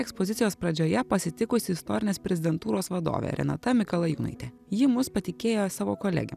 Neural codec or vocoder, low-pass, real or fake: none; 14.4 kHz; real